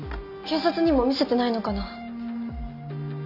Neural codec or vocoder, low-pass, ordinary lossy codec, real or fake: none; 5.4 kHz; none; real